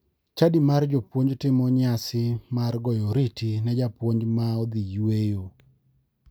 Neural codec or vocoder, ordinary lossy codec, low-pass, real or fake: none; none; none; real